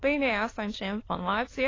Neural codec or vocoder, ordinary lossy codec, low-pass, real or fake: autoencoder, 22.05 kHz, a latent of 192 numbers a frame, VITS, trained on many speakers; AAC, 32 kbps; 7.2 kHz; fake